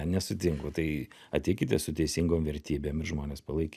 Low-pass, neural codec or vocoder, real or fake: 14.4 kHz; none; real